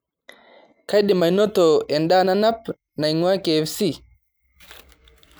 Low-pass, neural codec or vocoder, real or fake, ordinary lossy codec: none; none; real; none